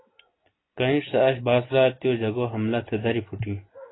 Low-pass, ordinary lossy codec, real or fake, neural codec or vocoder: 7.2 kHz; AAC, 16 kbps; real; none